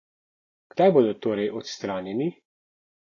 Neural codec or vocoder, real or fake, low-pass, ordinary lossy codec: none; real; 7.2 kHz; AAC, 32 kbps